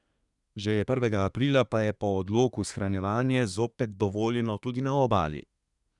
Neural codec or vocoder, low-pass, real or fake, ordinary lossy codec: codec, 24 kHz, 1 kbps, SNAC; 10.8 kHz; fake; none